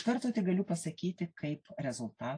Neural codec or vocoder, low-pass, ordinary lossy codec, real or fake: none; 9.9 kHz; AAC, 48 kbps; real